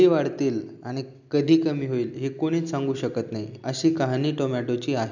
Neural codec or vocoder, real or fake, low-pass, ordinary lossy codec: none; real; 7.2 kHz; none